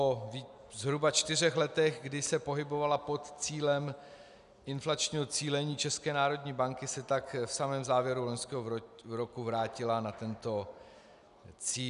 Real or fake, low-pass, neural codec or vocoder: real; 10.8 kHz; none